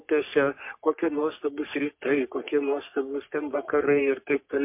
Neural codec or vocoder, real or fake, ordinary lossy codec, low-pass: codec, 44.1 kHz, 3.4 kbps, Pupu-Codec; fake; MP3, 32 kbps; 3.6 kHz